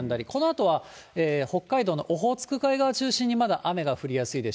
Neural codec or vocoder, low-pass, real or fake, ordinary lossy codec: none; none; real; none